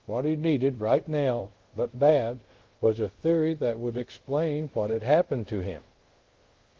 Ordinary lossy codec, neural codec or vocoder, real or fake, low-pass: Opus, 16 kbps; codec, 24 kHz, 0.5 kbps, DualCodec; fake; 7.2 kHz